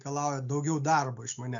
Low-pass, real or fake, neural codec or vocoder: 7.2 kHz; real; none